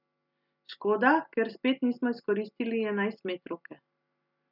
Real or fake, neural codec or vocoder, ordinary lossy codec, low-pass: real; none; none; 5.4 kHz